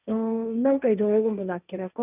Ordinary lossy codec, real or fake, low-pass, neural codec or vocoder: none; fake; 3.6 kHz; codec, 16 kHz, 1.1 kbps, Voila-Tokenizer